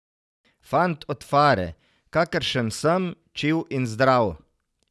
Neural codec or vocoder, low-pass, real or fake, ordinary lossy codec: none; none; real; none